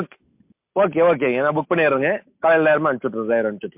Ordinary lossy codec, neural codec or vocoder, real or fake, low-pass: MP3, 32 kbps; none; real; 3.6 kHz